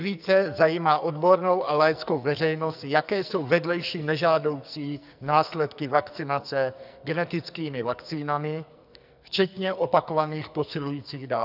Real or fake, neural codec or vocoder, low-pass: fake; codec, 44.1 kHz, 2.6 kbps, SNAC; 5.4 kHz